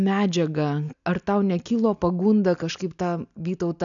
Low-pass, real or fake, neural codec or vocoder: 7.2 kHz; real; none